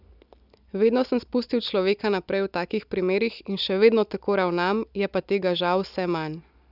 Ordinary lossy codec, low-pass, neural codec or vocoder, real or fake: none; 5.4 kHz; none; real